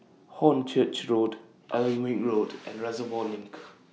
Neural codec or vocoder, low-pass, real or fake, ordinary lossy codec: none; none; real; none